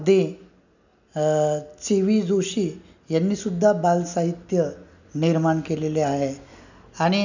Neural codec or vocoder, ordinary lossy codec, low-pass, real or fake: none; none; 7.2 kHz; real